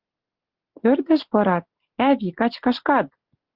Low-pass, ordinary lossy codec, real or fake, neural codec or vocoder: 5.4 kHz; Opus, 16 kbps; real; none